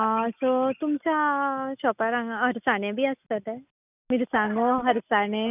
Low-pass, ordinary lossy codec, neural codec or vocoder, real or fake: 3.6 kHz; none; none; real